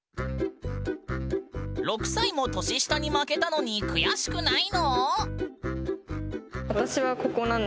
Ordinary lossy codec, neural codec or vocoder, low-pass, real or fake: none; none; none; real